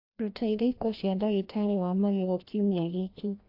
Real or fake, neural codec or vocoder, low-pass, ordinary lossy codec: fake; codec, 16 kHz, 1 kbps, FreqCodec, larger model; 5.4 kHz; AAC, 32 kbps